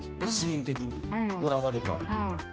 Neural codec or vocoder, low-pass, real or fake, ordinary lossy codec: codec, 16 kHz, 1 kbps, X-Codec, HuBERT features, trained on general audio; none; fake; none